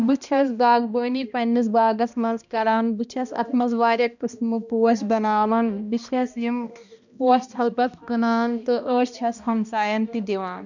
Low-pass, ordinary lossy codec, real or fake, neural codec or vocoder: 7.2 kHz; none; fake; codec, 16 kHz, 1 kbps, X-Codec, HuBERT features, trained on balanced general audio